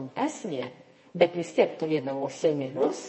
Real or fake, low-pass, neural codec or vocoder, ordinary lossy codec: fake; 10.8 kHz; codec, 24 kHz, 0.9 kbps, WavTokenizer, medium music audio release; MP3, 32 kbps